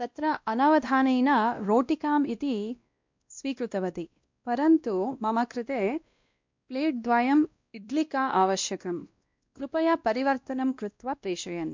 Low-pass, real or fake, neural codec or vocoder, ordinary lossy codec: 7.2 kHz; fake; codec, 16 kHz, 1 kbps, X-Codec, WavLM features, trained on Multilingual LibriSpeech; MP3, 64 kbps